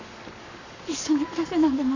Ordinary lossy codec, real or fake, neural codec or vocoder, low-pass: none; fake; codec, 44.1 kHz, 7.8 kbps, Pupu-Codec; 7.2 kHz